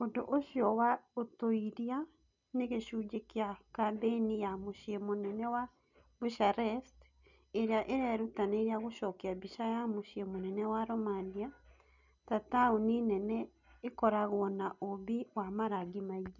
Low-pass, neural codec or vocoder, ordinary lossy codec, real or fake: 7.2 kHz; none; none; real